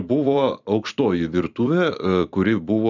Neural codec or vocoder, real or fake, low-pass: vocoder, 44.1 kHz, 128 mel bands every 256 samples, BigVGAN v2; fake; 7.2 kHz